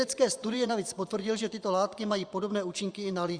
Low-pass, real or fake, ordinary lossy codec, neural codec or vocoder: 9.9 kHz; fake; Opus, 64 kbps; vocoder, 24 kHz, 100 mel bands, Vocos